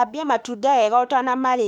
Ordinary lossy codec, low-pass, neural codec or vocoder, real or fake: none; 19.8 kHz; autoencoder, 48 kHz, 32 numbers a frame, DAC-VAE, trained on Japanese speech; fake